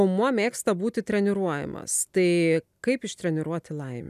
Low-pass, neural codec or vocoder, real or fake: 14.4 kHz; none; real